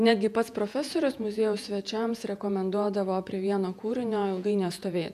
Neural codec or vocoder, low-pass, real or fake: vocoder, 48 kHz, 128 mel bands, Vocos; 14.4 kHz; fake